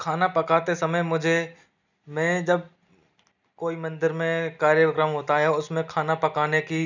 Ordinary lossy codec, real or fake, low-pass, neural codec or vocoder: none; real; 7.2 kHz; none